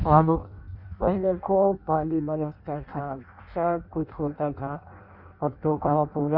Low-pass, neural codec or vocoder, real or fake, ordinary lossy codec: 5.4 kHz; codec, 16 kHz in and 24 kHz out, 0.6 kbps, FireRedTTS-2 codec; fake; none